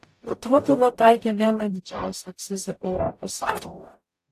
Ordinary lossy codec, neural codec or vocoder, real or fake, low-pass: AAC, 64 kbps; codec, 44.1 kHz, 0.9 kbps, DAC; fake; 14.4 kHz